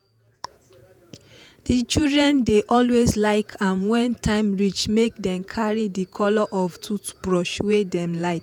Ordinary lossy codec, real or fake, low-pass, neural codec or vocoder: none; fake; 19.8 kHz; vocoder, 48 kHz, 128 mel bands, Vocos